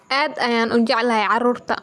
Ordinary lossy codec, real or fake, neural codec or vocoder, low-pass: none; real; none; none